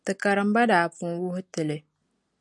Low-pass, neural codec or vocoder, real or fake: 10.8 kHz; none; real